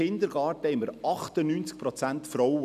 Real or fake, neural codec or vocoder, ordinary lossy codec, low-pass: real; none; none; 14.4 kHz